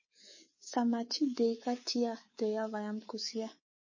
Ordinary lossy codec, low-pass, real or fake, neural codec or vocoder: MP3, 32 kbps; 7.2 kHz; fake; codec, 24 kHz, 3.1 kbps, DualCodec